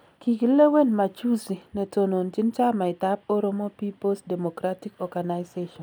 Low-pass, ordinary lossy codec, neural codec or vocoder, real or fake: none; none; none; real